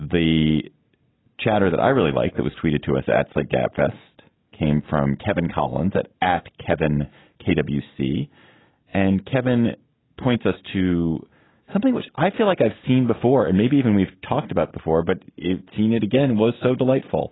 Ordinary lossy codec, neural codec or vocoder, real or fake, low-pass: AAC, 16 kbps; none; real; 7.2 kHz